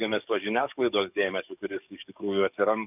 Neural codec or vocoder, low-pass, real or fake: codec, 44.1 kHz, 7.8 kbps, DAC; 3.6 kHz; fake